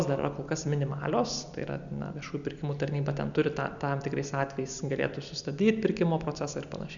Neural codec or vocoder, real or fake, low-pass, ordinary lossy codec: none; real; 7.2 kHz; MP3, 96 kbps